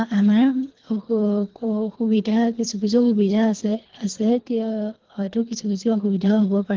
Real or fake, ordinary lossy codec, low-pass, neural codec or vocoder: fake; Opus, 16 kbps; 7.2 kHz; codec, 24 kHz, 3 kbps, HILCodec